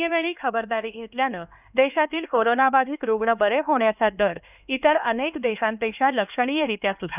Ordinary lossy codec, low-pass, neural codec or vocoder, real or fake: none; 3.6 kHz; codec, 16 kHz, 2 kbps, X-Codec, HuBERT features, trained on LibriSpeech; fake